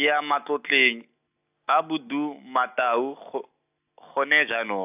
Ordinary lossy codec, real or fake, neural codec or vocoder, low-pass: AAC, 32 kbps; real; none; 3.6 kHz